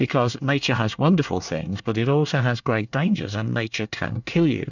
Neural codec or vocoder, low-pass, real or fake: codec, 24 kHz, 1 kbps, SNAC; 7.2 kHz; fake